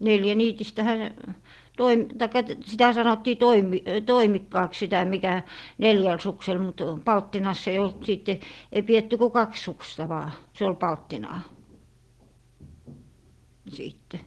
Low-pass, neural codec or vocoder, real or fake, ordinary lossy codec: 10.8 kHz; none; real; Opus, 16 kbps